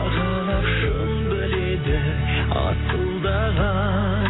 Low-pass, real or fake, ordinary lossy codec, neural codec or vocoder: 7.2 kHz; real; AAC, 16 kbps; none